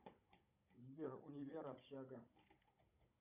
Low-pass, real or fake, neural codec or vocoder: 3.6 kHz; fake; codec, 16 kHz, 16 kbps, FunCodec, trained on Chinese and English, 50 frames a second